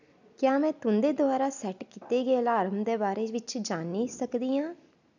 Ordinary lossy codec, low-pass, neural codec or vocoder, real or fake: none; 7.2 kHz; none; real